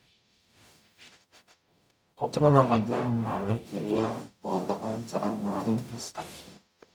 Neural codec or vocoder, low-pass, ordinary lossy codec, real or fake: codec, 44.1 kHz, 0.9 kbps, DAC; none; none; fake